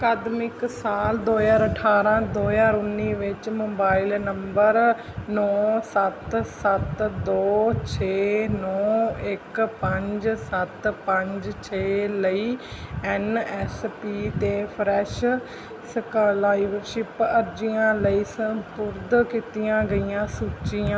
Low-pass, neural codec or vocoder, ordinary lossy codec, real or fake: none; none; none; real